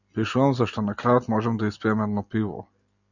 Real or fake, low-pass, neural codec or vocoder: real; 7.2 kHz; none